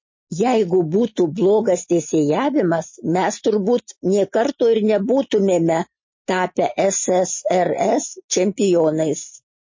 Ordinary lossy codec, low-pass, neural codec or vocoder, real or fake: MP3, 32 kbps; 7.2 kHz; none; real